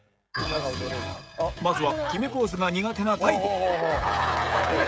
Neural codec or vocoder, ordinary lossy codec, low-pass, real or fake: codec, 16 kHz, 16 kbps, FreqCodec, smaller model; none; none; fake